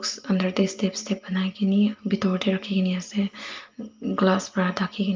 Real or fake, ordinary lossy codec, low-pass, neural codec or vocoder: real; Opus, 32 kbps; 7.2 kHz; none